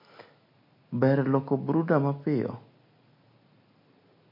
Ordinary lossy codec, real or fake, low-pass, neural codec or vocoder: MP3, 32 kbps; real; 5.4 kHz; none